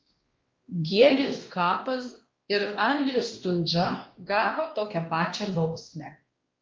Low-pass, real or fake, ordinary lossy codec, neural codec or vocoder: 7.2 kHz; fake; Opus, 32 kbps; codec, 16 kHz, 1 kbps, X-Codec, WavLM features, trained on Multilingual LibriSpeech